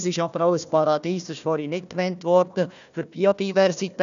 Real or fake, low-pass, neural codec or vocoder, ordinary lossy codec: fake; 7.2 kHz; codec, 16 kHz, 1 kbps, FunCodec, trained on Chinese and English, 50 frames a second; none